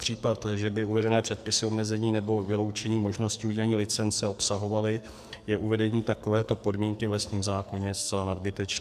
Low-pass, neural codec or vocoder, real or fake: 14.4 kHz; codec, 44.1 kHz, 2.6 kbps, SNAC; fake